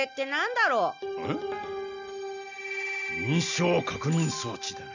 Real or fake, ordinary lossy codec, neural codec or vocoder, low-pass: real; none; none; 7.2 kHz